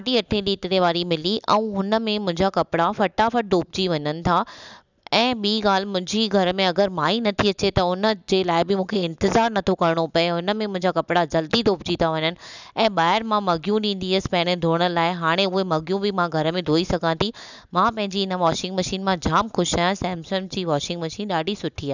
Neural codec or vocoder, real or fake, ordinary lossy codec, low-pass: none; real; none; 7.2 kHz